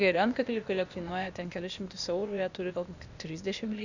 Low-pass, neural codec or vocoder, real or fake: 7.2 kHz; codec, 16 kHz, 0.8 kbps, ZipCodec; fake